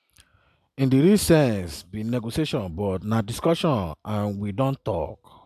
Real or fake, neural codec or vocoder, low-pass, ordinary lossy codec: real; none; 14.4 kHz; none